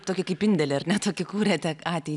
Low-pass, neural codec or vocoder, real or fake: 10.8 kHz; none; real